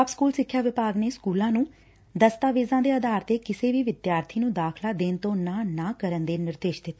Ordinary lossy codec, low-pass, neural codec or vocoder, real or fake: none; none; none; real